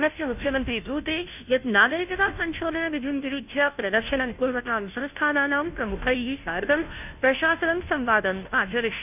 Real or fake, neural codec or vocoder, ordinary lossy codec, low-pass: fake; codec, 16 kHz, 0.5 kbps, FunCodec, trained on Chinese and English, 25 frames a second; none; 3.6 kHz